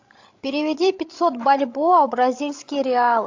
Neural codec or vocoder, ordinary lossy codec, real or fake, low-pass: codec, 16 kHz, 16 kbps, FreqCodec, larger model; AAC, 48 kbps; fake; 7.2 kHz